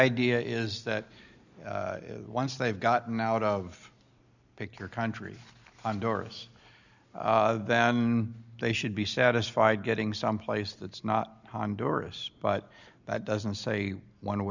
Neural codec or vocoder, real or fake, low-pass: none; real; 7.2 kHz